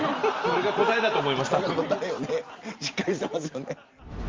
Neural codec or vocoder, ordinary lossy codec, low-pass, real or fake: none; Opus, 32 kbps; 7.2 kHz; real